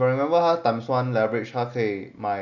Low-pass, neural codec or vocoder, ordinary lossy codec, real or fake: 7.2 kHz; none; none; real